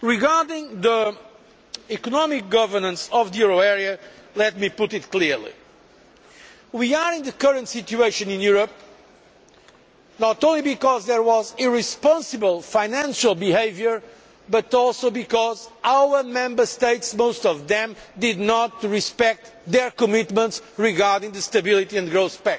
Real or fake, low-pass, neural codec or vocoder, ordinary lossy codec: real; none; none; none